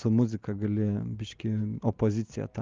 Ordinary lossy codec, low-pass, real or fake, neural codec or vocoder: Opus, 24 kbps; 7.2 kHz; real; none